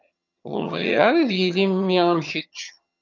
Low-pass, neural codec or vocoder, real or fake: 7.2 kHz; vocoder, 22.05 kHz, 80 mel bands, HiFi-GAN; fake